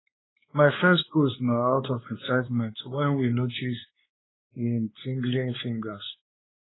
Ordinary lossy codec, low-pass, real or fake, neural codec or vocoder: AAC, 16 kbps; 7.2 kHz; fake; codec, 16 kHz, 2 kbps, X-Codec, WavLM features, trained on Multilingual LibriSpeech